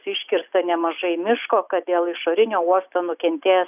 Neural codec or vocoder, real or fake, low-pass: none; real; 3.6 kHz